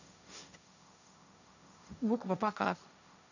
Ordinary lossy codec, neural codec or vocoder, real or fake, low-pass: none; codec, 16 kHz, 1.1 kbps, Voila-Tokenizer; fake; 7.2 kHz